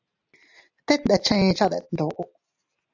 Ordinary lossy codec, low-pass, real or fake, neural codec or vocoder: AAC, 48 kbps; 7.2 kHz; real; none